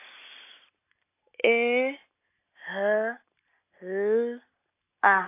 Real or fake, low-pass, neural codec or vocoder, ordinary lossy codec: real; 3.6 kHz; none; AAC, 24 kbps